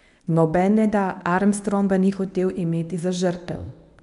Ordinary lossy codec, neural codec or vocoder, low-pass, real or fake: none; codec, 24 kHz, 0.9 kbps, WavTokenizer, medium speech release version 1; 10.8 kHz; fake